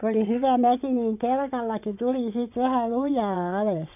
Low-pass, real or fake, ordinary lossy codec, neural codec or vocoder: 3.6 kHz; fake; none; vocoder, 22.05 kHz, 80 mel bands, WaveNeXt